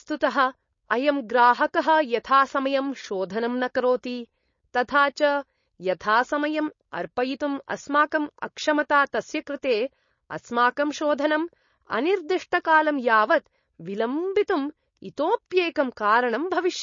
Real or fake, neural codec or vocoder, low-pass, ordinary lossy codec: fake; codec, 16 kHz, 4.8 kbps, FACodec; 7.2 kHz; MP3, 32 kbps